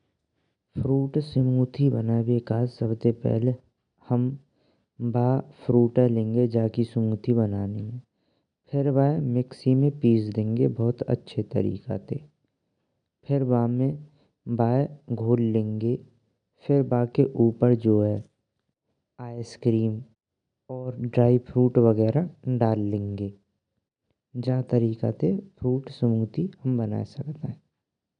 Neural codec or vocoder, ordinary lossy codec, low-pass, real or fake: none; none; 9.9 kHz; real